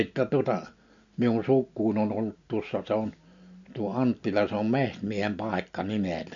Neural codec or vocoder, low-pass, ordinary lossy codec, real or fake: none; 7.2 kHz; MP3, 96 kbps; real